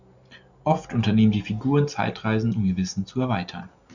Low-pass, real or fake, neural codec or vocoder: 7.2 kHz; real; none